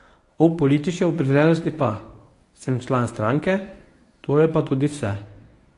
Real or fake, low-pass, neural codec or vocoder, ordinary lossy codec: fake; 10.8 kHz; codec, 24 kHz, 0.9 kbps, WavTokenizer, medium speech release version 1; AAC, 48 kbps